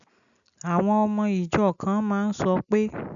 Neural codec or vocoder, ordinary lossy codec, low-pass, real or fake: none; none; 7.2 kHz; real